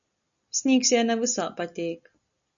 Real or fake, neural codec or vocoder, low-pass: real; none; 7.2 kHz